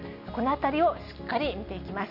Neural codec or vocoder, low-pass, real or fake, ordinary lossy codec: none; 5.4 kHz; real; none